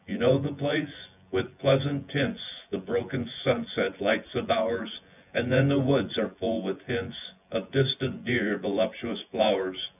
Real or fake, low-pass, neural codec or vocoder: fake; 3.6 kHz; vocoder, 24 kHz, 100 mel bands, Vocos